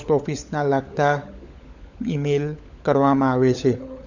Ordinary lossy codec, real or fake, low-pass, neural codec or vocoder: none; fake; 7.2 kHz; codec, 16 kHz, 16 kbps, FunCodec, trained on LibriTTS, 50 frames a second